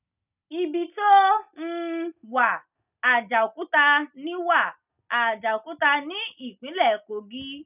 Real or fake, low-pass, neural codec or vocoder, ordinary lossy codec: real; 3.6 kHz; none; none